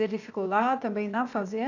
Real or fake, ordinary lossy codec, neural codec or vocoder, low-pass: fake; none; codec, 16 kHz, 0.8 kbps, ZipCodec; 7.2 kHz